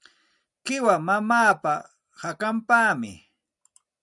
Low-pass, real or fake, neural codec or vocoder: 10.8 kHz; real; none